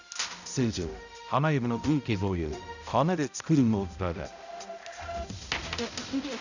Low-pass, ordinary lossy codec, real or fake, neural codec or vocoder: 7.2 kHz; none; fake; codec, 16 kHz, 0.5 kbps, X-Codec, HuBERT features, trained on balanced general audio